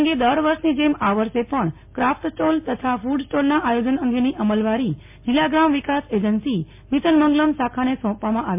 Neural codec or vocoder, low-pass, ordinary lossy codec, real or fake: none; 3.6 kHz; MP3, 32 kbps; real